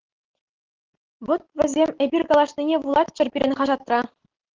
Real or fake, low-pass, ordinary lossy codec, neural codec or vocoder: real; 7.2 kHz; Opus, 16 kbps; none